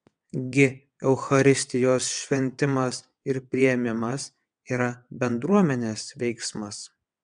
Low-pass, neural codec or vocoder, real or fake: 9.9 kHz; vocoder, 22.05 kHz, 80 mel bands, WaveNeXt; fake